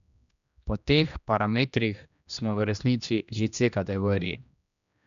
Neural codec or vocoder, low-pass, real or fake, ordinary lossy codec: codec, 16 kHz, 1 kbps, X-Codec, HuBERT features, trained on general audio; 7.2 kHz; fake; none